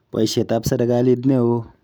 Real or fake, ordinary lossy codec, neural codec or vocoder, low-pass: real; none; none; none